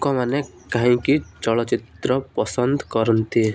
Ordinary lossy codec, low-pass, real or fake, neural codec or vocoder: none; none; real; none